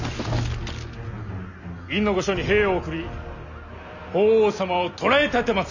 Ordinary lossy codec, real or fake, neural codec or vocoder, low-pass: none; real; none; 7.2 kHz